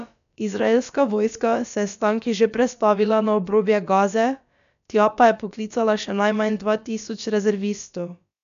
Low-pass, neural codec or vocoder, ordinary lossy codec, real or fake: 7.2 kHz; codec, 16 kHz, about 1 kbps, DyCAST, with the encoder's durations; none; fake